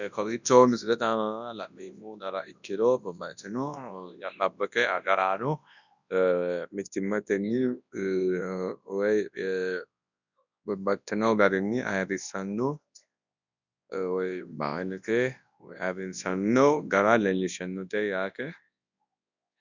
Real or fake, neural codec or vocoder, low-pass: fake; codec, 24 kHz, 0.9 kbps, WavTokenizer, large speech release; 7.2 kHz